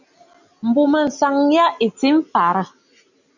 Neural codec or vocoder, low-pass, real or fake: none; 7.2 kHz; real